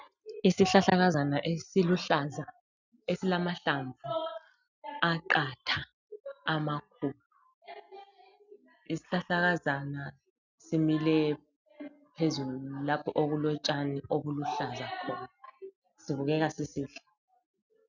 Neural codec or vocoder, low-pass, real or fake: none; 7.2 kHz; real